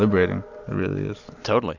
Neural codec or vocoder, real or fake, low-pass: none; real; 7.2 kHz